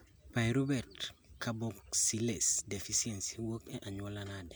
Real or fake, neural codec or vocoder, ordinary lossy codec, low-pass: real; none; none; none